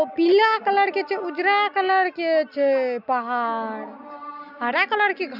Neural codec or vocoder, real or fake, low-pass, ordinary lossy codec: none; real; 5.4 kHz; none